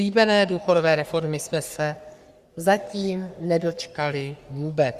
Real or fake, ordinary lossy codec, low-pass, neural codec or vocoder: fake; Opus, 64 kbps; 14.4 kHz; codec, 44.1 kHz, 3.4 kbps, Pupu-Codec